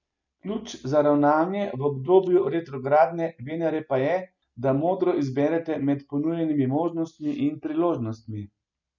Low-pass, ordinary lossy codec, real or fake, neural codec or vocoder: 7.2 kHz; none; real; none